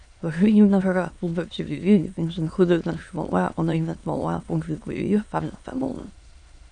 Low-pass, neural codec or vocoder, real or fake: 9.9 kHz; autoencoder, 22.05 kHz, a latent of 192 numbers a frame, VITS, trained on many speakers; fake